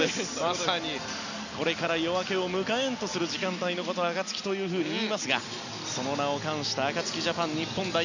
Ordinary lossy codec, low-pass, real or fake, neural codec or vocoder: none; 7.2 kHz; real; none